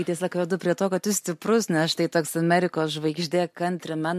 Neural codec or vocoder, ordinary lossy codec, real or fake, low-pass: none; MP3, 64 kbps; real; 14.4 kHz